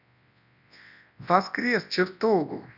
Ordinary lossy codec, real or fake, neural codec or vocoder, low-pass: none; fake; codec, 24 kHz, 0.9 kbps, WavTokenizer, large speech release; 5.4 kHz